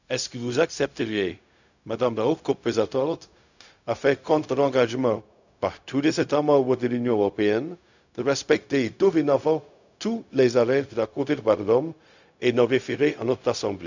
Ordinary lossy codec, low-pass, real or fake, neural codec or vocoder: none; 7.2 kHz; fake; codec, 16 kHz, 0.4 kbps, LongCat-Audio-Codec